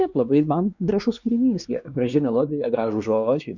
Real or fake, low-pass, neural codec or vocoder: fake; 7.2 kHz; codec, 16 kHz, 2 kbps, X-Codec, WavLM features, trained on Multilingual LibriSpeech